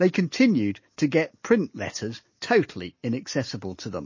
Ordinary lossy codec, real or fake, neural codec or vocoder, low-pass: MP3, 32 kbps; real; none; 7.2 kHz